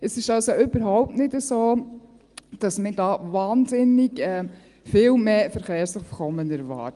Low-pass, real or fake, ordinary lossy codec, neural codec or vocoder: 10.8 kHz; real; Opus, 32 kbps; none